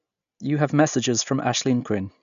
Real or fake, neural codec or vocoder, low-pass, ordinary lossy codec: real; none; 7.2 kHz; none